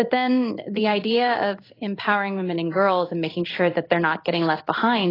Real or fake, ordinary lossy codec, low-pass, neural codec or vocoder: fake; AAC, 24 kbps; 5.4 kHz; codec, 16 kHz in and 24 kHz out, 1 kbps, XY-Tokenizer